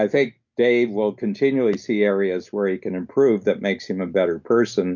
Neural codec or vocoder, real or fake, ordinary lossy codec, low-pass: none; real; MP3, 48 kbps; 7.2 kHz